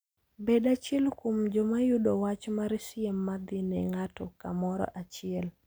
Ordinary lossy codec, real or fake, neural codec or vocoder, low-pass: none; real; none; none